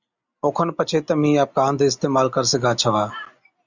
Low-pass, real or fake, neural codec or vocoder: 7.2 kHz; real; none